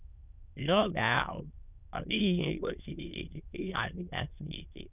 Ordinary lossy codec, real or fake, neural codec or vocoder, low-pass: none; fake; autoencoder, 22.05 kHz, a latent of 192 numbers a frame, VITS, trained on many speakers; 3.6 kHz